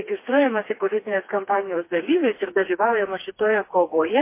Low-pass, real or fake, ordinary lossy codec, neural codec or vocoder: 3.6 kHz; fake; MP3, 24 kbps; codec, 16 kHz, 2 kbps, FreqCodec, smaller model